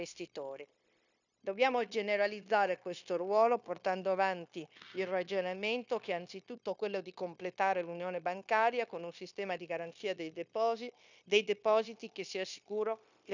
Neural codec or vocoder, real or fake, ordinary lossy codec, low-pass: codec, 16 kHz, 0.9 kbps, LongCat-Audio-Codec; fake; none; 7.2 kHz